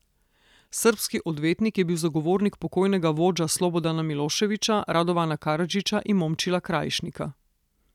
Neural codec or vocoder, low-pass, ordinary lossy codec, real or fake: none; 19.8 kHz; none; real